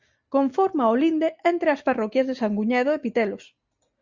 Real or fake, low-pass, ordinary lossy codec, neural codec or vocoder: real; 7.2 kHz; Opus, 64 kbps; none